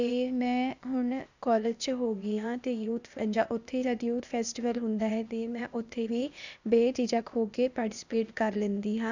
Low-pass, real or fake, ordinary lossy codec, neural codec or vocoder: 7.2 kHz; fake; none; codec, 16 kHz, 0.8 kbps, ZipCodec